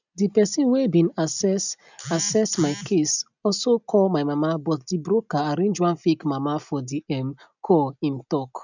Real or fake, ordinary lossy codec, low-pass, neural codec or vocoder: real; none; 7.2 kHz; none